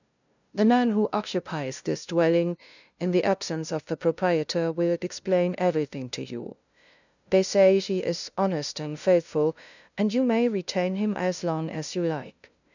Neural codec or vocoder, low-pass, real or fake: codec, 16 kHz, 0.5 kbps, FunCodec, trained on LibriTTS, 25 frames a second; 7.2 kHz; fake